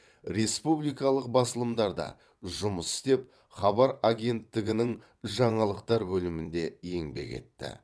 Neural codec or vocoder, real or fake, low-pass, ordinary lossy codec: vocoder, 22.05 kHz, 80 mel bands, WaveNeXt; fake; none; none